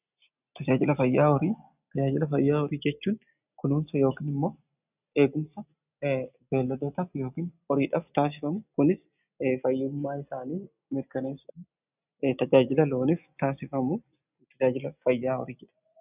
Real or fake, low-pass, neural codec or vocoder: real; 3.6 kHz; none